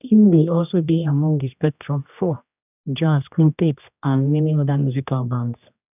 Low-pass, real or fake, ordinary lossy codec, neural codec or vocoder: 3.6 kHz; fake; none; codec, 16 kHz, 1 kbps, X-Codec, HuBERT features, trained on general audio